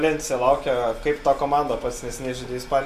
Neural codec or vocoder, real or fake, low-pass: none; real; 14.4 kHz